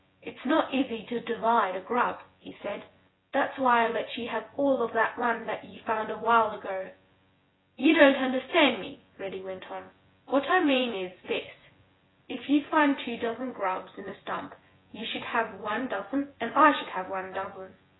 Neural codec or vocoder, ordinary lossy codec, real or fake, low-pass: vocoder, 24 kHz, 100 mel bands, Vocos; AAC, 16 kbps; fake; 7.2 kHz